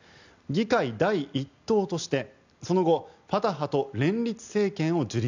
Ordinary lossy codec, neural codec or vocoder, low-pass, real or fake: none; none; 7.2 kHz; real